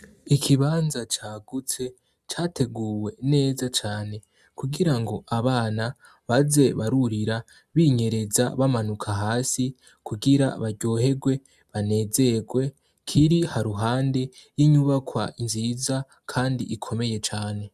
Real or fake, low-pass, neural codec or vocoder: real; 14.4 kHz; none